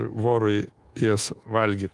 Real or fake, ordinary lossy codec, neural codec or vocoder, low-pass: fake; Opus, 24 kbps; codec, 24 kHz, 1.2 kbps, DualCodec; 10.8 kHz